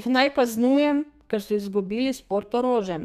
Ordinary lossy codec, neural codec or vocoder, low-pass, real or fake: none; codec, 32 kHz, 1.9 kbps, SNAC; 14.4 kHz; fake